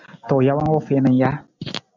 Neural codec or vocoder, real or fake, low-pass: none; real; 7.2 kHz